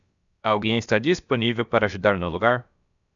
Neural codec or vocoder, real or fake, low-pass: codec, 16 kHz, about 1 kbps, DyCAST, with the encoder's durations; fake; 7.2 kHz